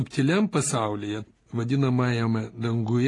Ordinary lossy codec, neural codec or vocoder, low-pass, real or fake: AAC, 32 kbps; none; 10.8 kHz; real